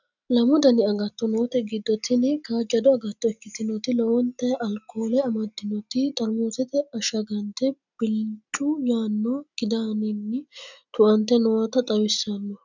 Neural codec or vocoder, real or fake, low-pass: none; real; 7.2 kHz